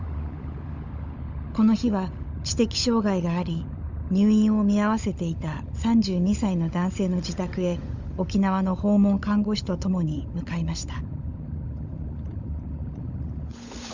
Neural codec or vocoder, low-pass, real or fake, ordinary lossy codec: codec, 16 kHz, 16 kbps, FunCodec, trained on LibriTTS, 50 frames a second; 7.2 kHz; fake; Opus, 64 kbps